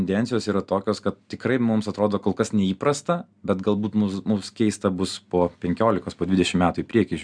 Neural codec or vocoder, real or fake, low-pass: none; real; 9.9 kHz